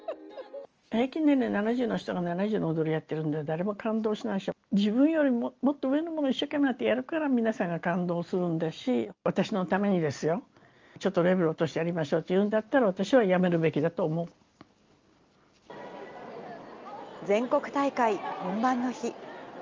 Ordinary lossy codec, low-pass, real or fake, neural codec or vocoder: Opus, 24 kbps; 7.2 kHz; real; none